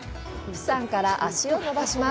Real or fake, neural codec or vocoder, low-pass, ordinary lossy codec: real; none; none; none